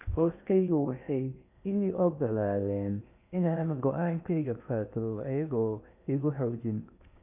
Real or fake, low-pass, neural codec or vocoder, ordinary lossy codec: fake; 3.6 kHz; codec, 16 kHz in and 24 kHz out, 0.6 kbps, FocalCodec, streaming, 4096 codes; none